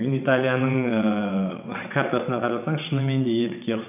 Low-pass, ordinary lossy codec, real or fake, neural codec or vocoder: 3.6 kHz; none; fake; vocoder, 22.05 kHz, 80 mel bands, WaveNeXt